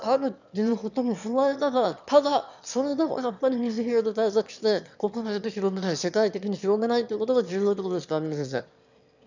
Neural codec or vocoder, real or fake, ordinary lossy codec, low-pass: autoencoder, 22.05 kHz, a latent of 192 numbers a frame, VITS, trained on one speaker; fake; none; 7.2 kHz